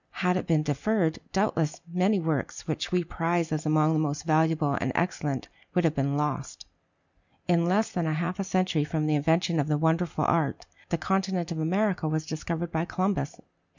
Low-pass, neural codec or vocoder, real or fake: 7.2 kHz; none; real